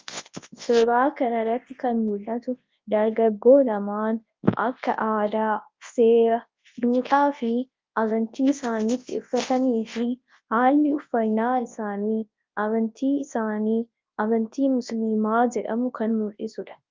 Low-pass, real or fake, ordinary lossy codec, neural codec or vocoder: 7.2 kHz; fake; Opus, 24 kbps; codec, 24 kHz, 0.9 kbps, WavTokenizer, large speech release